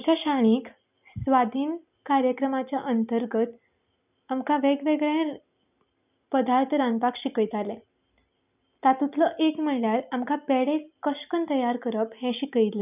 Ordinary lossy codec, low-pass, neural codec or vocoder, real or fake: none; 3.6 kHz; none; real